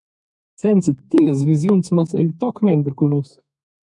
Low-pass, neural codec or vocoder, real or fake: 10.8 kHz; codec, 32 kHz, 1.9 kbps, SNAC; fake